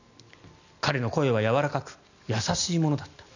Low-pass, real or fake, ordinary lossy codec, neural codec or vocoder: 7.2 kHz; real; none; none